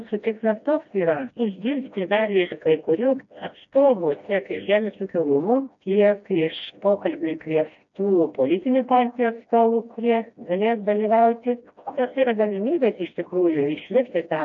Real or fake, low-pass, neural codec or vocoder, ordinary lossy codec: fake; 7.2 kHz; codec, 16 kHz, 1 kbps, FreqCodec, smaller model; AAC, 64 kbps